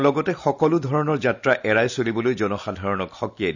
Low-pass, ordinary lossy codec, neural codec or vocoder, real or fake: 7.2 kHz; none; none; real